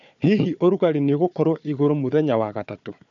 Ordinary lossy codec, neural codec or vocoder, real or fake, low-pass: none; codec, 16 kHz, 4 kbps, FunCodec, trained on Chinese and English, 50 frames a second; fake; 7.2 kHz